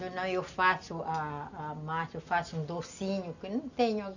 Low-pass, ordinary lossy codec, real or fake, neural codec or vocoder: 7.2 kHz; none; real; none